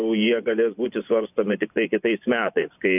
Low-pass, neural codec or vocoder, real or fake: 3.6 kHz; vocoder, 24 kHz, 100 mel bands, Vocos; fake